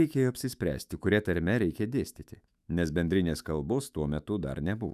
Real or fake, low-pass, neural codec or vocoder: fake; 14.4 kHz; autoencoder, 48 kHz, 128 numbers a frame, DAC-VAE, trained on Japanese speech